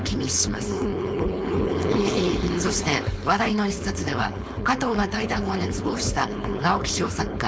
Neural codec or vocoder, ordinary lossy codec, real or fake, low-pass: codec, 16 kHz, 4.8 kbps, FACodec; none; fake; none